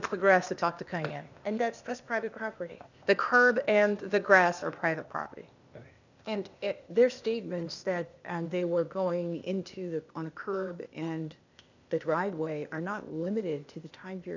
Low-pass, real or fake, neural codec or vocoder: 7.2 kHz; fake; codec, 16 kHz, 0.8 kbps, ZipCodec